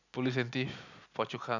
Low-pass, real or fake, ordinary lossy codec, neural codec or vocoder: 7.2 kHz; real; none; none